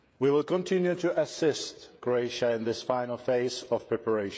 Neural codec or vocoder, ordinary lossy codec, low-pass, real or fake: codec, 16 kHz, 16 kbps, FreqCodec, smaller model; none; none; fake